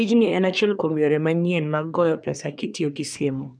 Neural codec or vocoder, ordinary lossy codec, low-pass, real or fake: codec, 24 kHz, 1 kbps, SNAC; none; 9.9 kHz; fake